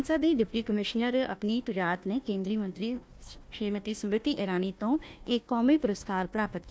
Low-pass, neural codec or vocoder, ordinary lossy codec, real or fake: none; codec, 16 kHz, 1 kbps, FunCodec, trained on Chinese and English, 50 frames a second; none; fake